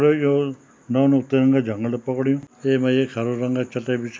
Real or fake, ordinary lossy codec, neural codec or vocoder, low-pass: real; none; none; none